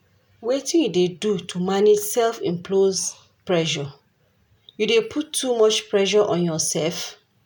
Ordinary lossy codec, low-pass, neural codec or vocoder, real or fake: none; none; none; real